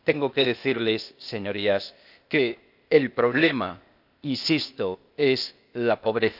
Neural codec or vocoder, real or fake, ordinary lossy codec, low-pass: codec, 16 kHz, 0.8 kbps, ZipCodec; fake; none; 5.4 kHz